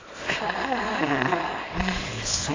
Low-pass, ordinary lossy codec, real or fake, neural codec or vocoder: 7.2 kHz; AAC, 32 kbps; fake; codec, 24 kHz, 0.9 kbps, WavTokenizer, small release